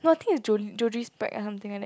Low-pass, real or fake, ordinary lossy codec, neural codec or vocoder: none; real; none; none